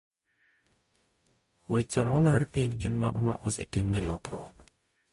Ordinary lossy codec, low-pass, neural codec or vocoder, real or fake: MP3, 48 kbps; 14.4 kHz; codec, 44.1 kHz, 0.9 kbps, DAC; fake